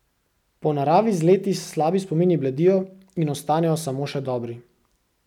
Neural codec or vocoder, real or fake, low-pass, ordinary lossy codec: none; real; 19.8 kHz; none